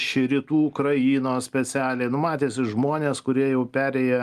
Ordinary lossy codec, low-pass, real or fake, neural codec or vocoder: Opus, 32 kbps; 14.4 kHz; real; none